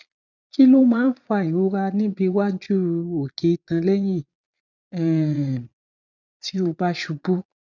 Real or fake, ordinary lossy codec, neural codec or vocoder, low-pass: fake; none; vocoder, 22.05 kHz, 80 mel bands, Vocos; 7.2 kHz